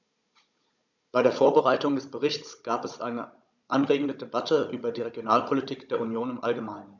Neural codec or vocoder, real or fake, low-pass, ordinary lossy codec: codec, 16 kHz, 16 kbps, FunCodec, trained on Chinese and English, 50 frames a second; fake; 7.2 kHz; none